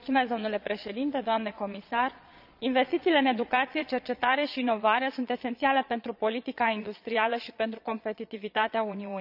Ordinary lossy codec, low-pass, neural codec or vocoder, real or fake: none; 5.4 kHz; vocoder, 22.05 kHz, 80 mel bands, Vocos; fake